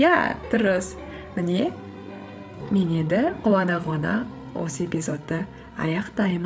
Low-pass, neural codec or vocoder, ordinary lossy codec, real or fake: none; codec, 16 kHz, 8 kbps, FreqCodec, larger model; none; fake